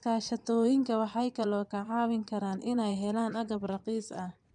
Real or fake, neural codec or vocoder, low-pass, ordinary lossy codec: fake; vocoder, 22.05 kHz, 80 mel bands, Vocos; 9.9 kHz; MP3, 96 kbps